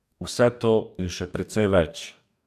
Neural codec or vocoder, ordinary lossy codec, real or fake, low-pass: codec, 44.1 kHz, 2.6 kbps, DAC; none; fake; 14.4 kHz